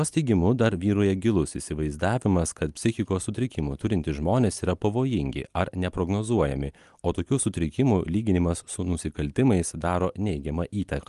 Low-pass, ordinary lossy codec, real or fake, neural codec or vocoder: 10.8 kHz; Opus, 32 kbps; real; none